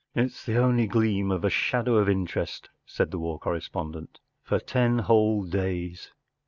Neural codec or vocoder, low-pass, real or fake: none; 7.2 kHz; real